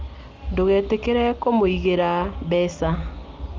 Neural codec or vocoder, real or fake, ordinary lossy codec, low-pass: none; real; Opus, 32 kbps; 7.2 kHz